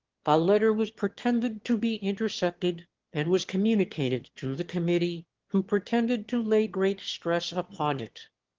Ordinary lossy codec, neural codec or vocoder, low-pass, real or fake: Opus, 16 kbps; autoencoder, 22.05 kHz, a latent of 192 numbers a frame, VITS, trained on one speaker; 7.2 kHz; fake